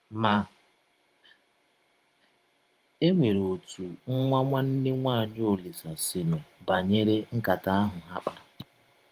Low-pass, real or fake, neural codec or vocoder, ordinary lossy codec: 14.4 kHz; fake; vocoder, 44.1 kHz, 128 mel bands every 512 samples, BigVGAN v2; Opus, 24 kbps